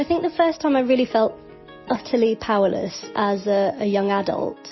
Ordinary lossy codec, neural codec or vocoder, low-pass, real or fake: MP3, 24 kbps; none; 7.2 kHz; real